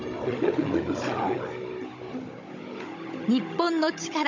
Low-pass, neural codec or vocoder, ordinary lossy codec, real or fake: 7.2 kHz; codec, 16 kHz, 16 kbps, FunCodec, trained on Chinese and English, 50 frames a second; MP3, 64 kbps; fake